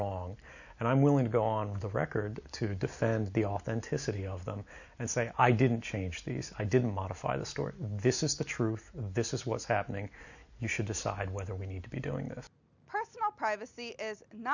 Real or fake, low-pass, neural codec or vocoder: real; 7.2 kHz; none